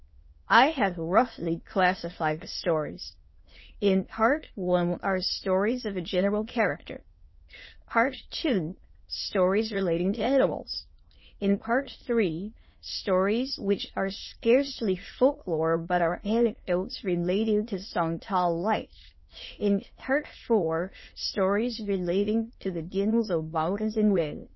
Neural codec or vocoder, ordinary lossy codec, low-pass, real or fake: autoencoder, 22.05 kHz, a latent of 192 numbers a frame, VITS, trained on many speakers; MP3, 24 kbps; 7.2 kHz; fake